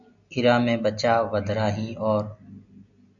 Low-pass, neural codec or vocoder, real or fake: 7.2 kHz; none; real